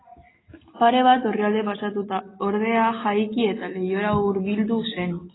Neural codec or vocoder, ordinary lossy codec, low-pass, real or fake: none; AAC, 16 kbps; 7.2 kHz; real